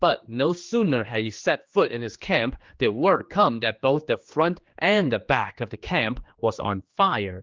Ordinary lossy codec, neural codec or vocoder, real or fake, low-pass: Opus, 32 kbps; codec, 16 kHz, 2 kbps, X-Codec, HuBERT features, trained on general audio; fake; 7.2 kHz